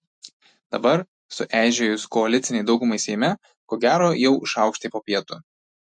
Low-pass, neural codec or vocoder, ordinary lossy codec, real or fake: 9.9 kHz; none; MP3, 48 kbps; real